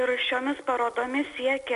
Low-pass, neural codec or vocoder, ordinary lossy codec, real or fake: 10.8 kHz; none; MP3, 96 kbps; real